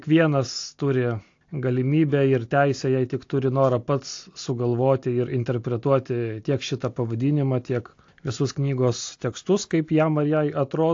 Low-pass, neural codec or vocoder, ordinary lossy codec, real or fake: 7.2 kHz; none; AAC, 48 kbps; real